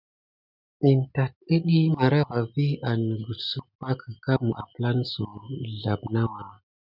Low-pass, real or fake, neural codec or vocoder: 5.4 kHz; real; none